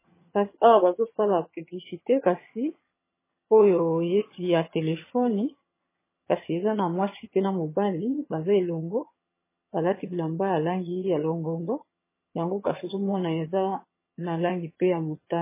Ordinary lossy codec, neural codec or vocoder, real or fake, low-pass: MP3, 16 kbps; vocoder, 22.05 kHz, 80 mel bands, HiFi-GAN; fake; 3.6 kHz